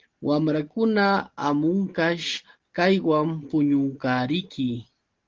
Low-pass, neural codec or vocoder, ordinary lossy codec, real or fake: 7.2 kHz; none; Opus, 16 kbps; real